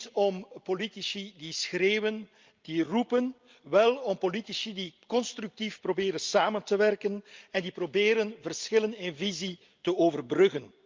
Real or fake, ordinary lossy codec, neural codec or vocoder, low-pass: real; Opus, 24 kbps; none; 7.2 kHz